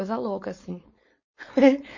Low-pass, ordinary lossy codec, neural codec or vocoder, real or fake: 7.2 kHz; MP3, 32 kbps; codec, 16 kHz, 4.8 kbps, FACodec; fake